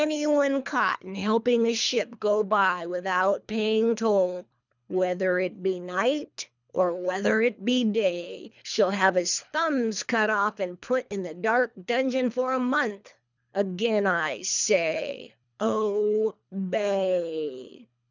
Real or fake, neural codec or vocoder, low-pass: fake; codec, 24 kHz, 3 kbps, HILCodec; 7.2 kHz